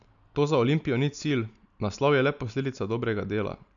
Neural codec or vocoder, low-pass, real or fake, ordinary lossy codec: none; 7.2 kHz; real; none